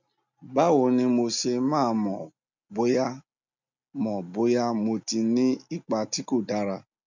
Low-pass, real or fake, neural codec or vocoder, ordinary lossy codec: 7.2 kHz; real; none; none